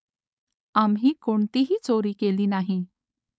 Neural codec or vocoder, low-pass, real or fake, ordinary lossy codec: codec, 16 kHz, 4.8 kbps, FACodec; none; fake; none